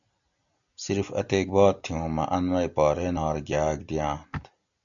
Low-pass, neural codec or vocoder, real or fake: 7.2 kHz; none; real